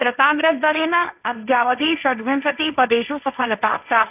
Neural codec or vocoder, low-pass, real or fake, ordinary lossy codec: codec, 16 kHz, 1.1 kbps, Voila-Tokenizer; 3.6 kHz; fake; none